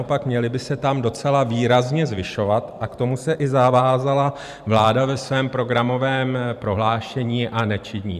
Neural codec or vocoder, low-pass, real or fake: vocoder, 44.1 kHz, 128 mel bands every 512 samples, BigVGAN v2; 14.4 kHz; fake